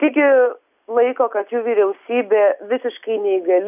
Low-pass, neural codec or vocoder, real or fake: 3.6 kHz; none; real